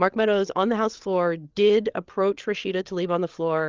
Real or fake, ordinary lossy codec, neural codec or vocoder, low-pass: fake; Opus, 24 kbps; codec, 16 kHz, 8 kbps, FreqCodec, larger model; 7.2 kHz